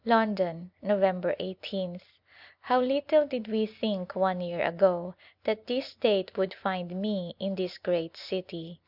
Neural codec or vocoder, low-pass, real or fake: none; 5.4 kHz; real